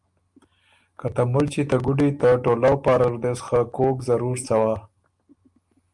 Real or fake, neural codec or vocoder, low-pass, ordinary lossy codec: real; none; 10.8 kHz; Opus, 32 kbps